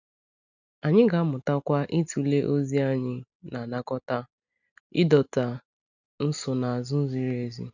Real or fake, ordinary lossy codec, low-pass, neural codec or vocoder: real; none; 7.2 kHz; none